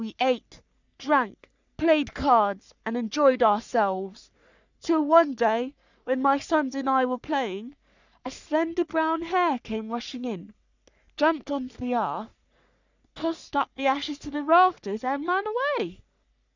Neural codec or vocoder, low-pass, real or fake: codec, 44.1 kHz, 3.4 kbps, Pupu-Codec; 7.2 kHz; fake